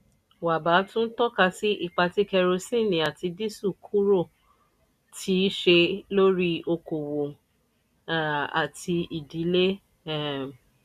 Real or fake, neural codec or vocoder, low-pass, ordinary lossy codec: real; none; 14.4 kHz; none